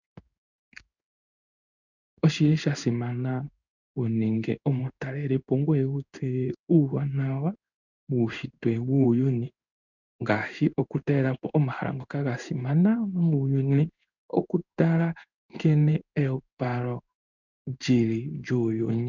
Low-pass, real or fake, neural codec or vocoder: 7.2 kHz; fake; codec, 16 kHz in and 24 kHz out, 1 kbps, XY-Tokenizer